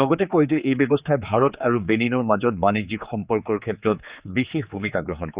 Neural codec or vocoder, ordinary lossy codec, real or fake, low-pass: codec, 16 kHz, 4 kbps, X-Codec, HuBERT features, trained on general audio; Opus, 64 kbps; fake; 3.6 kHz